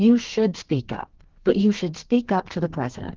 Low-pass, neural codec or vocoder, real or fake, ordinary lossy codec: 7.2 kHz; codec, 32 kHz, 1.9 kbps, SNAC; fake; Opus, 16 kbps